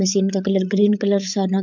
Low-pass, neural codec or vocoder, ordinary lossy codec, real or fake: 7.2 kHz; codec, 16 kHz, 8 kbps, FreqCodec, larger model; MP3, 64 kbps; fake